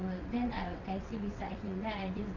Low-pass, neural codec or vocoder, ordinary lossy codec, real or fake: 7.2 kHz; vocoder, 22.05 kHz, 80 mel bands, WaveNeXt; none; fake